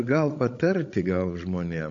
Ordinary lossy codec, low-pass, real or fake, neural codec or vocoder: AAC, 48 kbps; 7.2 kHz; fake; codec, 16 kHz, 16 kbps, FreqCodec, larger model